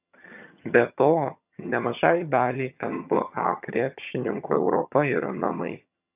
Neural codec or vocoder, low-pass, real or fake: vocoder, 22.05 kHz, 80 mel bands, HiFi-GAN; 3.6 kHz; fake